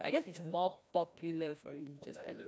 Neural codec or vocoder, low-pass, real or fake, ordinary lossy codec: codec, 16 kHz, 1 kbps, FreqCodec, larger model; none; fake; none